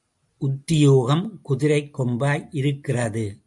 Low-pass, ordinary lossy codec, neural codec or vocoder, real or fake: 10.8 kHz; AAC, 64 kbps; none; real